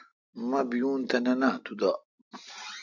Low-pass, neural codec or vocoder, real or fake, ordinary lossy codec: 7.2 kHz; none; real; AAC, 48 kbps